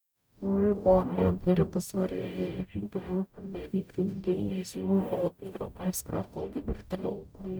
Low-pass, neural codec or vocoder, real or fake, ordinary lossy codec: none; codec, 44.1 kHz, 0.9 kbps, DAC; fake; none